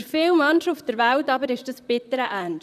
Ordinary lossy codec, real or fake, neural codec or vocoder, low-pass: none; fake; vocoder, 44.1 kHz, 128 mel bands, Pupu-Vocoder; 14.4 kHz